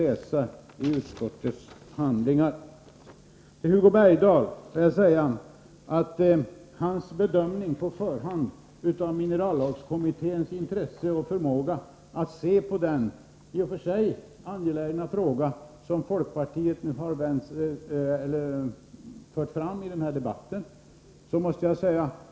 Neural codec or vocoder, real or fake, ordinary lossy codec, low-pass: none; real; none; none